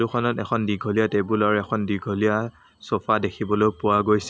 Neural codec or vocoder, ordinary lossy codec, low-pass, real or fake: none; none; none; real